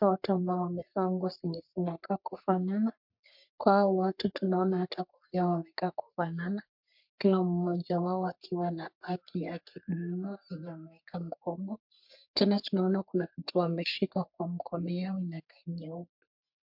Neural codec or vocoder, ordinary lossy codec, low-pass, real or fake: codec, 44.1 kHz, 3.4 kbps, Pupu-Codec; MP3, 48 kbps; 5.4 kHz; fake